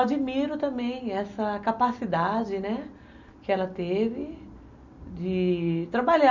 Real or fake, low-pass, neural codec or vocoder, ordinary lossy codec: real; 7.2 kHz; none; none